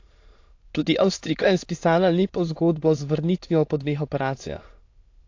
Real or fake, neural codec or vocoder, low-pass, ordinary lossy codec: fake; autoencoder, 22.05 kHz, a latent of 192 numbers a frame, VITS, trained on many speakers; 7.2 kHz; AAC, 48 kbps